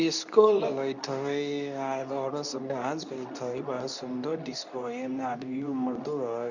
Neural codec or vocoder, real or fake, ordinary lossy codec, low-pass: codec, 24 kHz, 0.9 kbps, WavTokenizer, medium speech release version 2; fake; none; 7.2 kHz